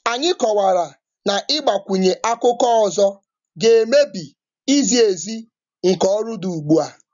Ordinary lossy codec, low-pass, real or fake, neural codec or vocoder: none; 7.2 kHz; real; none